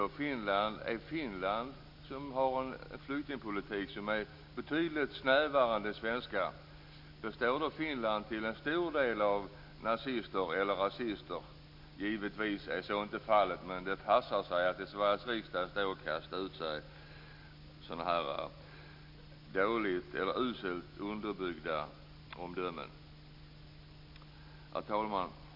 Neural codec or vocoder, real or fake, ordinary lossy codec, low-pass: none; real; MP3, 48 kbps; 5.4 kHz